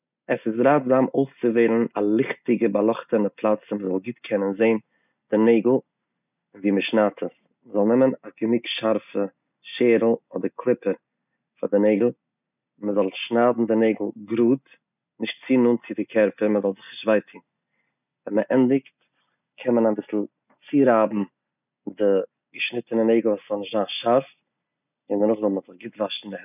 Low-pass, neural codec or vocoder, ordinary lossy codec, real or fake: 3.6 kHz; none; none; real